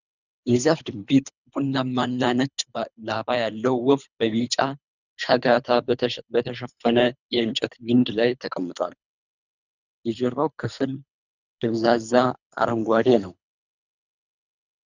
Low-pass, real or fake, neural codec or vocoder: 7.2 kHz; fake; codec, 24 kHz, 3 kbps, HILCodec